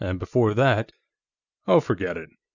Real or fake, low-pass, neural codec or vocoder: real; 7.2 kHz; none